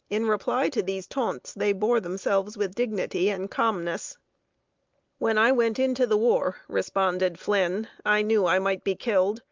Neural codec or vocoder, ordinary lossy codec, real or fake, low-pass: none; Opus, 24 kbps; real; 7.2 kHz